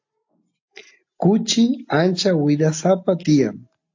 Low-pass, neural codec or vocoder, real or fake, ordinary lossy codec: 7.2 kHz; none; real; AAC, 48 kbps